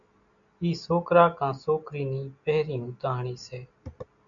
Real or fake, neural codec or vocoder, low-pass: real; none; 7.2 kHz